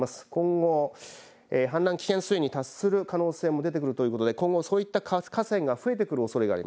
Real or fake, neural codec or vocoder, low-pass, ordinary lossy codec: real; none; none; none